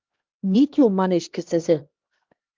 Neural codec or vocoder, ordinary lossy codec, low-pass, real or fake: codec, 16 kHz, 1 kbps, X-Codec, HuBERT features, trained on LibriSpeech; Opus, 32 kbps; 7.2 kHz; fake